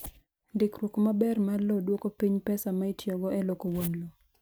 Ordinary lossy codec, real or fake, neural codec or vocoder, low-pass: none; real; none; none